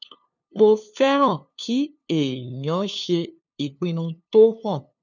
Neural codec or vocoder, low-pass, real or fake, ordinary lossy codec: codec, 16 kHz, 2 kbps, FunCodec, trained on LibriTTS, 25 frames a second; 7.2 kHz; fake; none